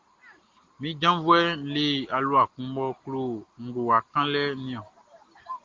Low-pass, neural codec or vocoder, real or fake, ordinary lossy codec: 7.2 kHz; none; real; Opus, 16 kbps